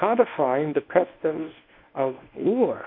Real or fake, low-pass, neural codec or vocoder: fake; 5.4 kHz; codec, 24 kHz, 0.9 kbps, WavTokenizer, medium speech release version 1